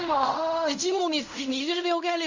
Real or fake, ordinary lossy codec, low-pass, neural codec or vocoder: fake; Opus, 64 kbps; 7.2 kHz; codec, 16 kHz in and 24 kHz out, 0.4 kbps, LongCat-Audio-Codec, fine tuned four codebook decoder